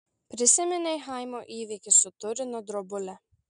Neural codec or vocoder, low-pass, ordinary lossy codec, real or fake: none; 9.9 kHz; MP3, 96 kbps; real